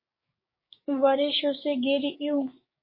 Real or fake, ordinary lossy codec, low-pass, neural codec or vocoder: fake; MP3, 24 kbps; 5.4 kHz; codec, 16 kHz, 6 kbps, DAC